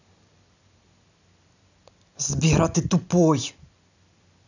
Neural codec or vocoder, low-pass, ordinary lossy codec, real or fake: none; 7.2 kHz; none; real